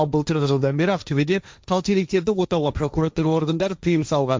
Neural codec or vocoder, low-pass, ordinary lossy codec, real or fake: codec, 16 kHz, 1.1 kbps, Voila-Tokenizer; none; none; fake